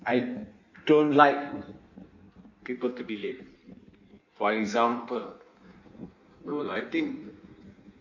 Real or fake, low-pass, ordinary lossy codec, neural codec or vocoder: fake; 7.2 kHz; none; codec, 16 kHz in and 24 kHz out, 1.1 kbps, FireRedTTS-2 codec